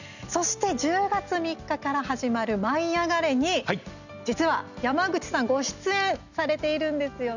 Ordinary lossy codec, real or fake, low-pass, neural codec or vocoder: none; real; 7.2 kHz; none